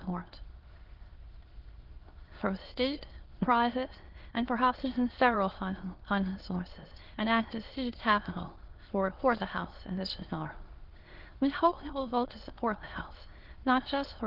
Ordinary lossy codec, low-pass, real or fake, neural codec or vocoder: Opus, 16 kbps; 5.4 kHz; fake; autoencoder, 22.05 kHz, a latent of 192 numbers a frame, VITS, trained on many speakers